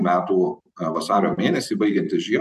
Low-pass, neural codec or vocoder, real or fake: 14.4 kHz; vocoder, 44.1 kHz, 128 mel bands every 256 samples, BigVGAN v2; fake